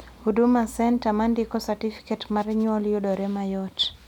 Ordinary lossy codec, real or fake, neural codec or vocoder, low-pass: none; real; none; 19.8 kHz